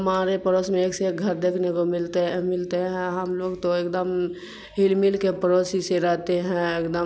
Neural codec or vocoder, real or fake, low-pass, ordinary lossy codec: none; real; none; none